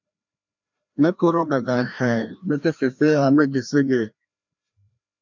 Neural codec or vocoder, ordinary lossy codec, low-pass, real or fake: codec, 16 kHz, 1 kbps, FreqCodec, larger model; MP3, 64 kbps; 7.2 kHz; fake